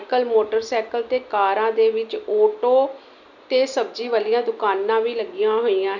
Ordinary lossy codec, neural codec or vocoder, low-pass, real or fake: none; none; 7.2 kHz; real